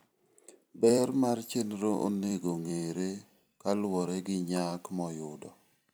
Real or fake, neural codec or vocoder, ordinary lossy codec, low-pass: fake; vocoder, 44.1 kHz, 128 mel bands every 256 samples, BigVGAN v2; none; none